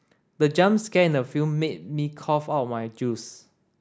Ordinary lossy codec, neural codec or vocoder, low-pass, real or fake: none; none; none; real